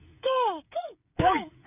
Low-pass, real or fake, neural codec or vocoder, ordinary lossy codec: 3.6 kHz; fake; codec, 16 kHz, 8 kbps, FreqCodec, smaller model; none